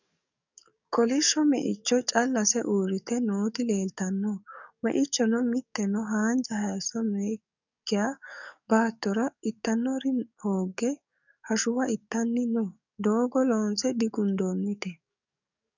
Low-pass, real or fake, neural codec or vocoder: 7.2 kHz; fake; codec, 44.1 kHz, 7.8 kbps, DAC